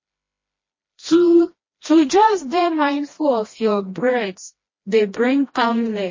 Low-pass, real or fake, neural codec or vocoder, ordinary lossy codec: 7.2 kHz; fake; codec, 16 kHz, 1 kbps, FreqCodec, smaller model; MP3, 32 kbps